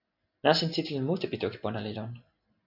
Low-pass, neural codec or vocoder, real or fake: 5.4 kHz; none; real